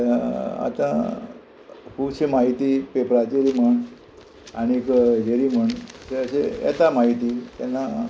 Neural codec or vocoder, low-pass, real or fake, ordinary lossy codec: none; none; real; none